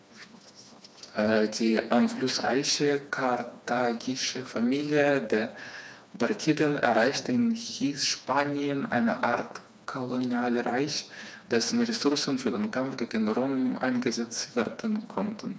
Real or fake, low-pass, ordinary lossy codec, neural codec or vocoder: fake; none; none; codec, 16 kHz, 2 kbps, FreqCodec, smaller model